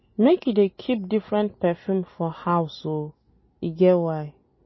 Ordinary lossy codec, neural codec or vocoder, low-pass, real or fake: MP3, 24 kbps; none; 7.2 kHz; real